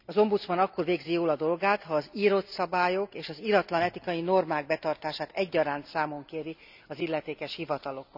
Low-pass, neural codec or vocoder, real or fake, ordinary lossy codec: 5.4 kHz; none; real; none